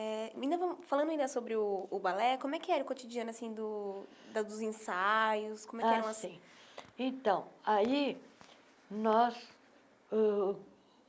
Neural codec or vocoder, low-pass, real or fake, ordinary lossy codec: none; none; real; none